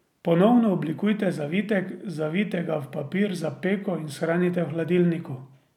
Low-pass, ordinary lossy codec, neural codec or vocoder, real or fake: 19.8 kHz; none; none; real